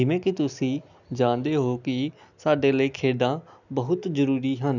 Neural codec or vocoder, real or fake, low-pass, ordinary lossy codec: none; real; 7.2 kHz; none